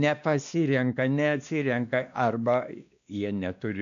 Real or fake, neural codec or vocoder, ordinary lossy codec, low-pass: fake; codec, 16 kHz, 2 kbps, X-Codec, WavLM features, trained on Multilingual LibriSpeech; AAC, 96 kbps; 7.2 kHz